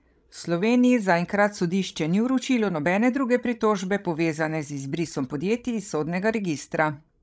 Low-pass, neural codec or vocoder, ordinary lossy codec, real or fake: none; codec, 16 kHz, 16 kbps, FreqCodec, larger model; none; fake